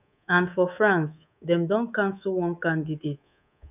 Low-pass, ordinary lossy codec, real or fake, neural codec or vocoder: 3.6 kHz; none; fake; codec, 24 kHz, 3.1 kbps, DualCodec